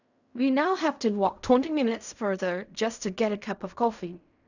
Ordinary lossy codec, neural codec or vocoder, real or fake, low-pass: none; codec, 16 kHz in and 24 kHz out, 0.4 kbps, LongCat-Audio-Codec, fine tuned four codebook decoder; fake; 7.2 kHz